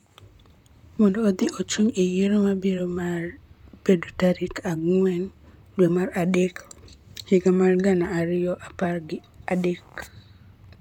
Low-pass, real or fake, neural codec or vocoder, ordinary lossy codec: 19.8 kHz; fake; vocoder, 44.1 kHz, 128 mel bands, Pupu-Vocoder; none